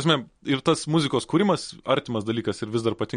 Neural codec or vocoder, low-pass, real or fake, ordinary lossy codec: none; 9.9 kHz; real; MP3, 48 kbps